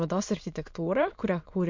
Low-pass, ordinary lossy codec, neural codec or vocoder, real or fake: 7.2 kHz; MP3, 48 kbps; autoencoder, 22.05 kHz, a latent of 192 numbers a frame, VITS, trained on many speakers; fake